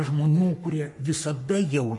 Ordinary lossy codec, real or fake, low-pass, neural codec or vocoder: MP3, 48 kbps; fake; 10.8 kHz; codec, 44.1 kHz, 3.4 kbps, Pupu-Codec